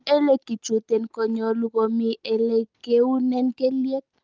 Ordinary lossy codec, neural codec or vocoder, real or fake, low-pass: Opus, 32 kbps; none; real; 7.2 kHz